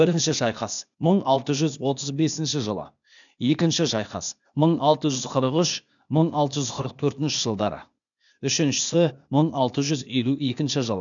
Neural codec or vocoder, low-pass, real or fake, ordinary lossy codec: codec, 16 kHz, 0.8 kbps, ZipCodec; 7.2 kHz; fake; none